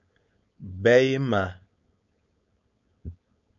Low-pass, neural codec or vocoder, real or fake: 7.2 kHz; codec, 16 kHz, 4.8 kbps, FACodec; fake